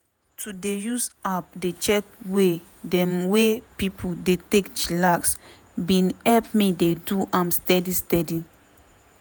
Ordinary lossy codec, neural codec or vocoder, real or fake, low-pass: none; vocoder, 48 kHz, 128 mel bands, Vocos; fake; none